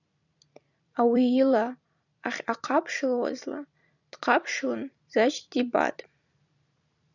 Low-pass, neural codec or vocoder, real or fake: 7.2 kHz; vocoder, 44.1 kHz, 128 mel bands every 256 samples, BigVGAN v2; fake